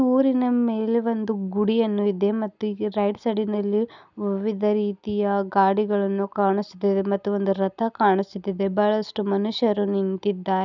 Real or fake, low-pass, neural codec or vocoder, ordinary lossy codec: real; 7.2 kHz; none; none